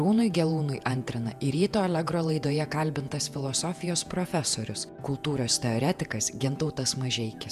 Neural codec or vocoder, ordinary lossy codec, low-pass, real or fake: vocoder, 48 kHz, 128 mel bands, Vocos; MP3, 96 kbps; 14.4 kHz; fake